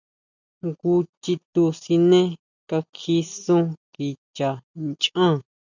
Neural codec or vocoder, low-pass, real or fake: none; 7.2 kHz; real